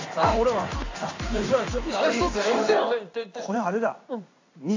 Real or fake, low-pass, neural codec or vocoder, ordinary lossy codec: fake; 7.2 kHz; codec, 16 kHz in and 24 kHz out, 1 kbps, XY-Tokenizer; AAC, 48 kbps